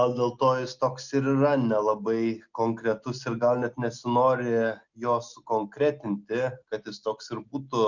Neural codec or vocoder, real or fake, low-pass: none; real; 7.2 kHz